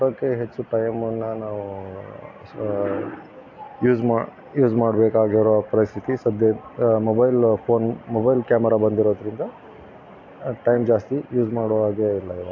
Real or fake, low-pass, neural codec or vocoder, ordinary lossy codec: real; 7.2 kHz; none; AAC, 48 kbps